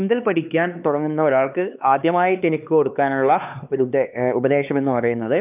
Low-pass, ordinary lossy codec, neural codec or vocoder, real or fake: 3.6 kHz; none; codec, 16 kHz, 2 kbps, X-Codec, HuBERT features, trained on LibriSpeech; fake